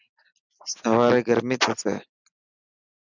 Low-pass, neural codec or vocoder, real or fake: 7.2 kHz; none; real